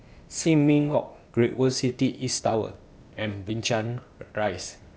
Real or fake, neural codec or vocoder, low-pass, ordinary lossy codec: fake; codec, 16 kHz, 0.8 kbps, ZipCodec; none; none